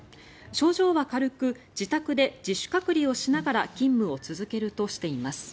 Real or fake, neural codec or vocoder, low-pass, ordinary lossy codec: real; none; none; none